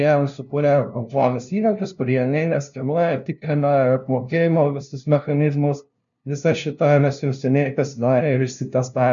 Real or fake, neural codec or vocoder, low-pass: fake; codec, 16 kHz, 0.5 kbps, FunCodec, trained on LibriTTS, 25 frames a second; 7.2 kHz